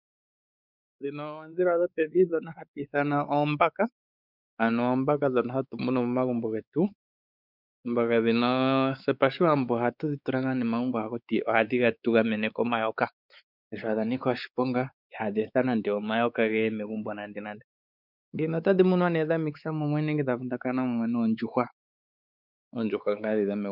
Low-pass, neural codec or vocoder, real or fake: 5.4 kHz; codec, 16 kHz, 4 kbps, X-Codec, WavLM features, trained on Multilingual LibriSpeech; fake